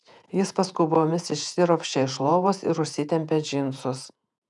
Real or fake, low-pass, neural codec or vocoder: fake; 10.8 kHz; vocoder, 48 kHz, 128 mel bands, Vocos